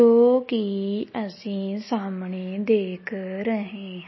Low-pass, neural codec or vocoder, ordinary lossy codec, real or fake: 7.2 kHz; none; MP3, 24 kbps; real